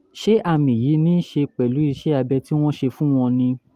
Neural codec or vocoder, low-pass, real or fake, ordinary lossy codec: none; 14.4 kHz; real; Opus, 32 kbps